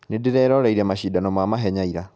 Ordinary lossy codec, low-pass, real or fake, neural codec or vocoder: none; none; real; none